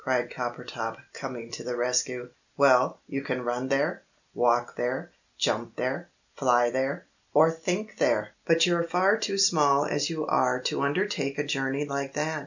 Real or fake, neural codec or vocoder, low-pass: real; none; 7.2 kHz